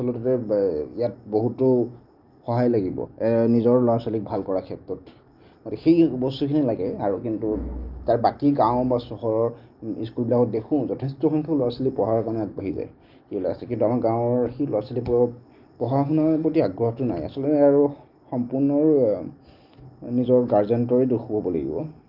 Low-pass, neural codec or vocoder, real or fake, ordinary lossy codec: 5.4 kHz; none; real; Opus, 24 kbps